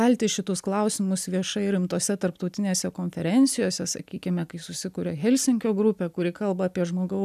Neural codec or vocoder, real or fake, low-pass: none; real; 14.4 kHz